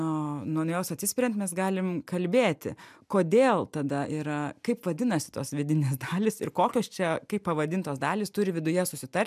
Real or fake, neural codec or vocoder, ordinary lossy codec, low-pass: real; none; MP3, 96 kbps; 14.4 kHz